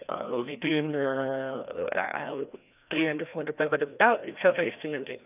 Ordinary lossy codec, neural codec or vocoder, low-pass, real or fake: none; codec, 16 kHz, 1 kbps, FreqCodec, larger model; 3.6 kHz; fake